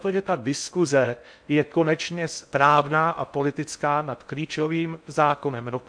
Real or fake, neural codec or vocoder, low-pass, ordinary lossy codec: fake; codec, 16 kHz in and 24 kHz out, 0.6 kbps, FocalCodec, streaming, 4096 codes; 9.9 kHz; MP3, 64 kbps